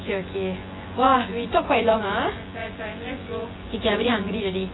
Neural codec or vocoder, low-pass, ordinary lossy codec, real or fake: vocoder, 24 kHz, 100 mel bands, Vocos; 7.2 kHz; AAC, 16 kbps; fake